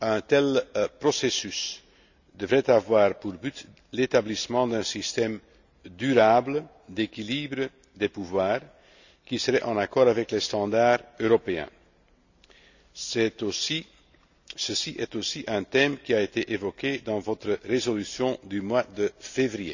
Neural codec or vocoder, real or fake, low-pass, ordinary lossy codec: none; real; 7.2 kHz; none